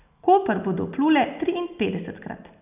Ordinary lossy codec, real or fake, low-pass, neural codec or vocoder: none; real; 3.6 kHz; none